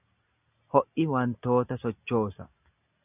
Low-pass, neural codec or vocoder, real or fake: 3.6 kHz; none; real